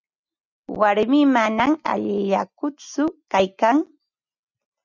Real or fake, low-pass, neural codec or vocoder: real; 7.2 kHz; none